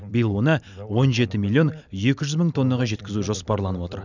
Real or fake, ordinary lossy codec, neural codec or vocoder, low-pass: real; none; none; 7.2 kHz